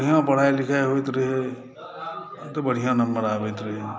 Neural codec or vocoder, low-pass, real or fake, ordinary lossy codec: none; none; real; none